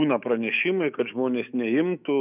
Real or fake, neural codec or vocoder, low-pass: fake; autoencoder, 48 kHz, 128 numbers a frame, DAC-VAE, trained on Japanese speech; 3.6 kHz